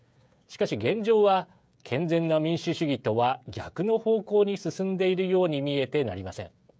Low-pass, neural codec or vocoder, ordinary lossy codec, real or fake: none; codec, 16 kHz, 16 kbps, FreqCodec, smaller model; none; fake